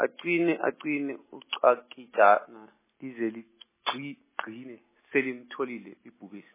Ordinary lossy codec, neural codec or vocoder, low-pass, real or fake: MP3, 16 kbps; none; 3.6 kHz; real